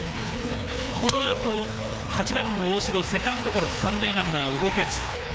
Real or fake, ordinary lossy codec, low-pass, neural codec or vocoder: fake; none; none; codec, 16 kHz, 2 kbps, FreqCodec, larger model